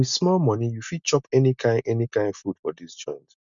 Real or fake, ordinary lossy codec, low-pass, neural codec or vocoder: real; none; 7.2 kHz; none